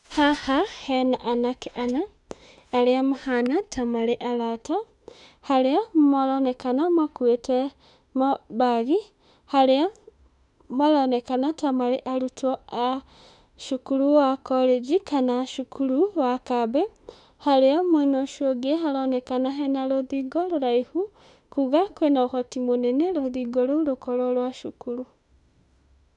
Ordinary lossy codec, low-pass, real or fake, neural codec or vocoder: none; 10.8 kHz; fake; autoencoder, 48 kHz, 32 numbers a frame, DAC-VAE, trained on Japanese speech